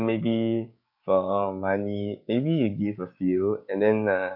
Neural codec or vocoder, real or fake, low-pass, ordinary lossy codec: codec, 16 kHz, 6 kbps, DAC; fake; 5.4 kHz; none